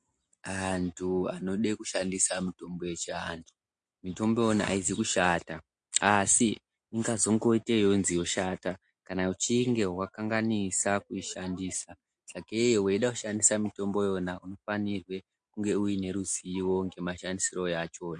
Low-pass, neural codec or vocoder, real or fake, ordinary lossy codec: 9.9 kHz; none; real; MP3, 48 kbps